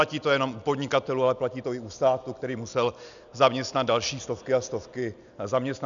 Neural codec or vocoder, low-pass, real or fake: none; 7.2 kHz; real